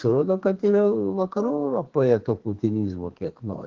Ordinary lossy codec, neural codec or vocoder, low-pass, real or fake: Opus, 16 kbps; codec, 32 kHz, 1.9 kbps, SNAC; 7.2 kHz; fake